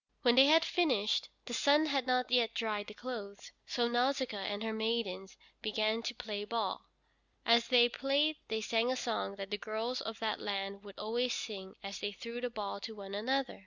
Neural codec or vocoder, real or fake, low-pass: none; real; 7.2 kHz